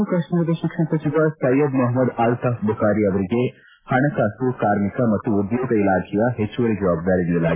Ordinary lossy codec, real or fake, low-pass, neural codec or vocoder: AAC, 16 kbps; real; 3.6 kHz; none